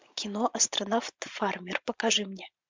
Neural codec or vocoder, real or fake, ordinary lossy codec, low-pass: vocoder, 44.1 kHz, 80 mel bands, Vocos; fake; MP3, 64 kbps; 7.2 kHz